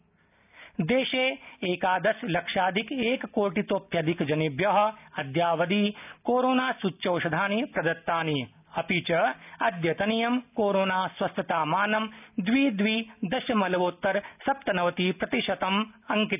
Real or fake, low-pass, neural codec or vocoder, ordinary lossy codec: real; 3.6 kHz; none; none